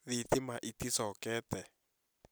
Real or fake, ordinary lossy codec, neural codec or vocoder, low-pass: real; none; none; none